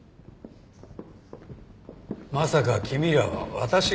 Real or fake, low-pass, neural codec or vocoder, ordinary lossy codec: real; none; none; none